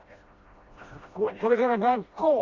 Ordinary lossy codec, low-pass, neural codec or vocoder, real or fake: Opus, 64 kbps; 7.2 kHz; codec, 16 kHz, 1 kbps, FreqCodec, smaller model; fake